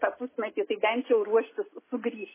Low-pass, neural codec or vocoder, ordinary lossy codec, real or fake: 3.6 kHz; none; MP3, 16 kbps; real